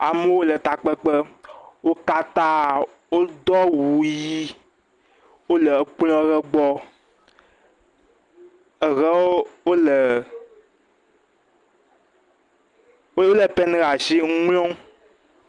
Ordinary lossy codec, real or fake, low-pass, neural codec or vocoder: Opus, 32 kbps; real; 10.8 kHz; none